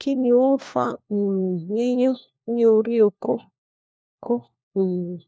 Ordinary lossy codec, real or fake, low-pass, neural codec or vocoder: none; fake; none; codec, 16 kHz, 1 kbps, FunCodec, trained on LibriTTS, 50 frames a second